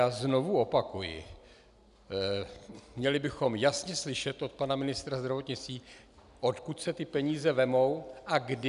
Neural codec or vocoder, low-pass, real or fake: none; 10.8 kHz; real